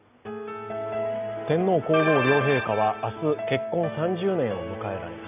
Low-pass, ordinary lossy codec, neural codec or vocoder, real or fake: 3.6 kHz; none; none; real